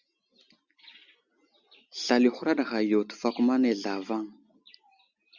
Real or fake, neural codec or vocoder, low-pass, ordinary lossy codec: real; none; 7.2 kHz; Opus, 64 kbps